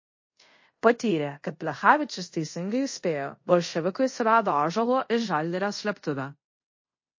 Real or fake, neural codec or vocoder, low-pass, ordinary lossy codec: fake; codec, 24 kHz, 0.5 kbps, DualCodec; 7.2 kHz; MP3, 32 kbps